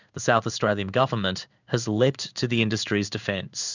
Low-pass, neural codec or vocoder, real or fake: 7.2 kHz; codec, 16 kHz in and 24 kHz out, 1 kbps, XY-Tokenizer; fake